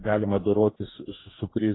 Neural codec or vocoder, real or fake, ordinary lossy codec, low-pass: codec, 44.1 kHz, 2.6 kbps, DAC; fake; AAC, 16 kbps; 7.2 kHz